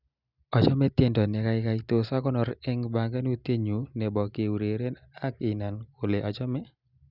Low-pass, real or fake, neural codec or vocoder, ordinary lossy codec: 5.4 kHz; real; none; Opus, 64 kbps